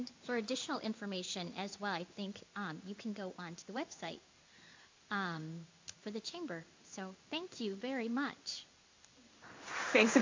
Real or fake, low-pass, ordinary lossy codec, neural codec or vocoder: fake; 7.2 kHz; MP3, 48 kbps; codec, 16 kHz in and 24 kHz out, 1 kbps, XY-Tokenizer